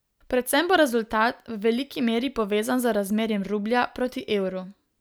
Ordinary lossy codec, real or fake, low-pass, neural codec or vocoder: none; real; none; none